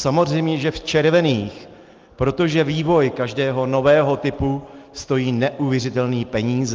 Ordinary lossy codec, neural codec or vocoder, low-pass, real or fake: Opus, 32 kbps; none; 7.2 kHz; real